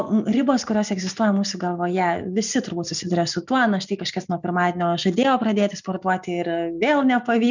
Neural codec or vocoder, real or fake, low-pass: none; real; 7.2 kHz